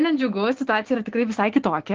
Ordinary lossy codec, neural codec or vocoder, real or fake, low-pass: Opus, 16 kbps; codec, 16 kHz, 6 kbps, DAC; fake; 7.2 kHz